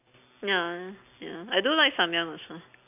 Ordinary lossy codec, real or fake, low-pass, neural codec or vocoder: none; real; 3.6 kHz; none